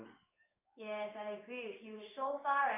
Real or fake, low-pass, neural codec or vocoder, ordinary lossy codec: fake; 3.6 kHz; codec, 16 kHz in and 24 kHz out, 1 kbps, XY-Tokenizer; none